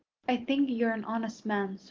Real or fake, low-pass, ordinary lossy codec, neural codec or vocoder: real; 7.2 kHz; Opus, 24 kbps; none